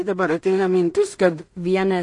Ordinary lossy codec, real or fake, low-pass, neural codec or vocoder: MP3, 48 kbps; fake; 10.8 kHz; codec, 16 kHz in and 24 kHz out, 0.4 kbps, LongCat-Audio-Codec, two codebook decoder